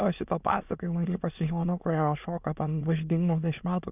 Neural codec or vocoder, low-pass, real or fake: autoencoder, 22.05 kHz, a latent of 192 numbers a frame, VITS, trained on many speakers; 3.6 kHz; fake